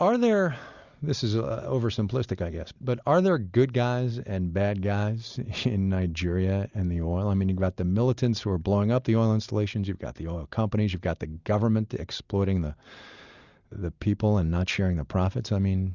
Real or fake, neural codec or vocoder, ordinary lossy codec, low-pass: real; none; Opus, 64 kbps; 7.2 kHz